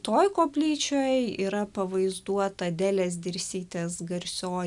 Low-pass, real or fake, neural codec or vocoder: 10.8 kHz; real; none